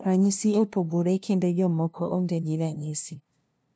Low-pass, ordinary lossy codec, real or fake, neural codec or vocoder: none; none; fake; codec, 16 kHz, 0.5 kbps, FunCodec, trained on LibriTTS, 25 frames a second